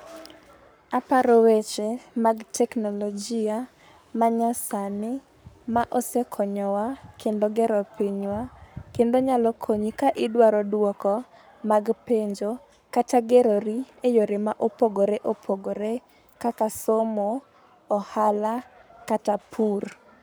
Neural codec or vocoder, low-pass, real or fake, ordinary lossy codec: codec, 44.1 kHz, 7.8 kbps, Pupu-Codec; none; fake; none